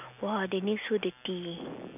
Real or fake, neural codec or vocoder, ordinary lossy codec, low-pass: real; none; none; 3.6 kHz